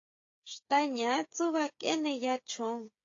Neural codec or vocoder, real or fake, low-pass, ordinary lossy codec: codec, 16 kHz, 8 kbps, FreqCodec, smaller model; fake; 7.2 kHz; AAC, 48 kbps